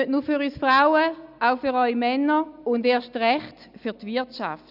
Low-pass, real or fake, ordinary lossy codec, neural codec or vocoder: 5.4 kHz; real; none; none